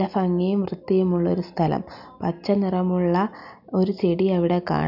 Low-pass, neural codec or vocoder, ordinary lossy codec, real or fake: 5.4 kHz; none; none; real